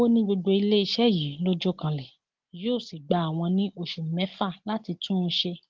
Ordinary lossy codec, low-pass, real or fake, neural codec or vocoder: Opus, 16 kbps; 7.2 kHz; real; none